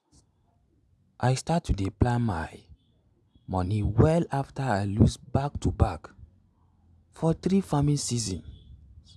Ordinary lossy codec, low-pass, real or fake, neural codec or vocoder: none; none; real; none